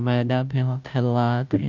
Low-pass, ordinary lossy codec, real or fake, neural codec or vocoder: 7.2 kHz; none; fake; codec, 16 kHz, 0.5 kbps, FunCodec, trained on Chinese and English, 25 frames a second